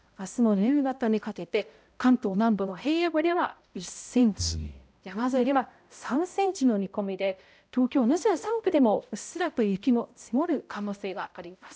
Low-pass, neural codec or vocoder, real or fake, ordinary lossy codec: none; codec, 16 kHz, 0.5 kbps, X-Codec, HuBERT features, trained on balanced general audio; fake; none